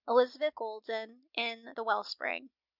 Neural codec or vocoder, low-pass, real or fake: none; 5.4 kHz; real